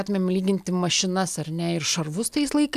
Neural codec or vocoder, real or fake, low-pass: none; real; 14.4 kHz